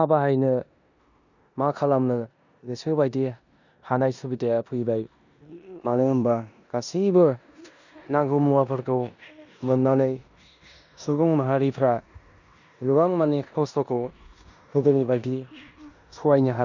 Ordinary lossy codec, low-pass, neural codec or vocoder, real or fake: none; 7.2 kHz; codec, 16 kHz in and 24 kHz out, 0.9 kbps, LongCat-Audio-Codec, four codebook decoder; fake